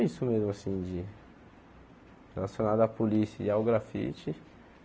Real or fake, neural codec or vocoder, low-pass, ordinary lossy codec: real; none; none; none